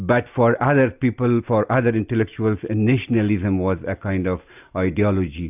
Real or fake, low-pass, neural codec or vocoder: real; 3.6 kHz; none